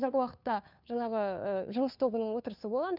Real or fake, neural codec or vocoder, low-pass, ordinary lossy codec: fake; codec, 16 kHz, 2 kbps, FunCodec, trained on Chinese and English, 25 frames a second; 5.4 kHz; none